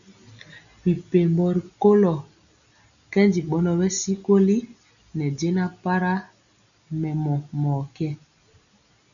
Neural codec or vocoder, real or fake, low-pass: none; real; 7.2 kHz